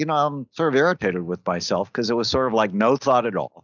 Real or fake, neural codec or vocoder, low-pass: real; none; 7.2 kHz